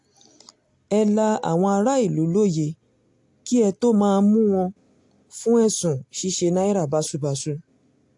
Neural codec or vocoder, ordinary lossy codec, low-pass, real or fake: none; AAC, 64 kbps; 10.8 kHz; real